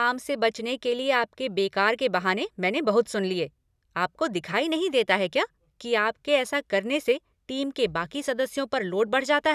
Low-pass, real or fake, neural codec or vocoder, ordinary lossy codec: 14.4 kHz; real; none; none